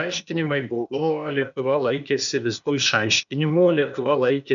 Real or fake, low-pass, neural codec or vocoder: fake; 7.2 kHz; codec, 16 kHz, 0.8 kbps, ZipCodec